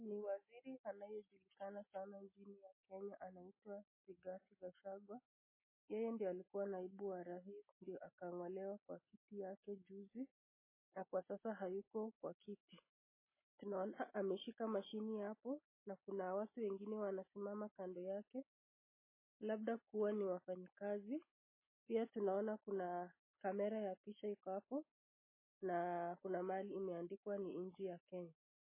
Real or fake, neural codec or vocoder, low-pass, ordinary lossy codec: real; none; 3.6 kHz; MP3, 16 kbps